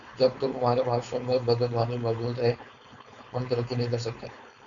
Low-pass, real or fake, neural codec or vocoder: 7.2 kHz; fake; codec, 16 kHz, 4.8 kbps, FACodec